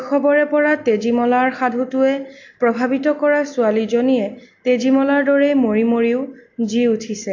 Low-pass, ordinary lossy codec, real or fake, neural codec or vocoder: 7.2 kHz; AAC, 48 kbps; real; none